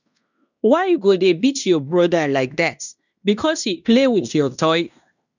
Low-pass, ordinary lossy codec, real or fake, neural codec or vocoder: 7.2 kHz; none; fake; codec, 16 kHz in and 24 kHz out, 0.9 kbps, LongCat-Audio-Codec, fine tuned four codebook decoder